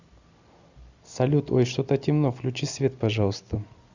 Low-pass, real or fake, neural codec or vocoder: 7.2 kHz; real; none